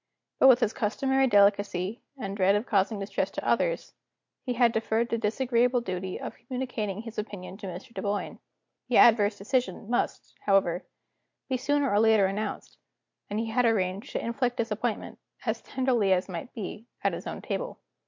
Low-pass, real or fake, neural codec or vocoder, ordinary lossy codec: 7.2 kHz; real; none; MP3, 48 kbps